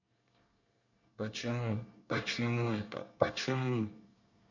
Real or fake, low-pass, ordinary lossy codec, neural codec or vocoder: fake; 7.2 kHz; none; codec, 24 kHz, 1 kbps, SNAC